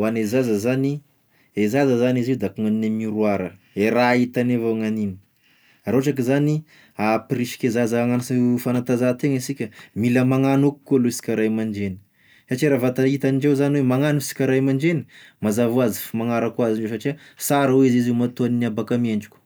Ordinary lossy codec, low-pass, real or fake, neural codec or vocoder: none; none; real; none